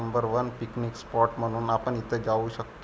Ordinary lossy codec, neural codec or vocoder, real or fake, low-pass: none; none; real; none